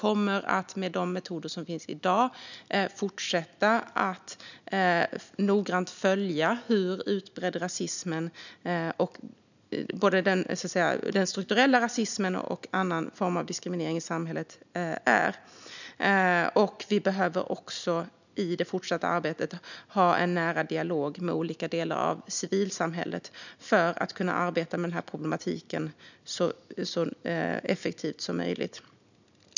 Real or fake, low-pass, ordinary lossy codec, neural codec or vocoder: real; 7.2 kHz; none; none